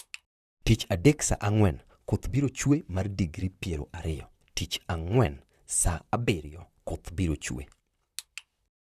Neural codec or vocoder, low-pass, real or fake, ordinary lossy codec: vocoder, 44.1 kHz, 128 mel bands, Pupu-Vocoder; 14.4 kHz; fake; none